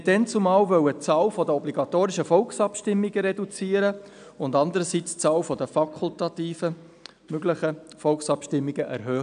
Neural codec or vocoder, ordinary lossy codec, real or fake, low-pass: none; AAC, 96 kbps; real; 9.9 kHz